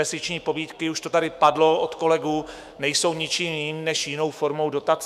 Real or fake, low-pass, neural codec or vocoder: fake; 14.4 kHz; autoencoder, 48 kHz, 128 numbers a frame, DAC-VAE, trained on Japanese speech